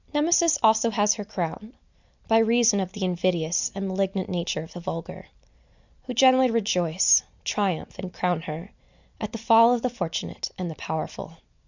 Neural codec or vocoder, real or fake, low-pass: none; real; 7.2 kHz